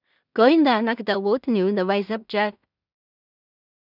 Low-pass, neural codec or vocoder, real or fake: 5.4 kHz; codec, 16 kHz in and 24 kHz out, 0.4 kbps, LongCat-Audio-Codec, two codebook decoder; fake